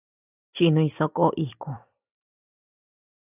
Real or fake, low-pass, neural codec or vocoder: real; 3.6 kHz; none